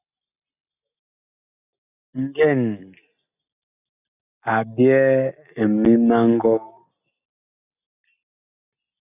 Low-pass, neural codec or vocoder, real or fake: 3.6 kHz; none; real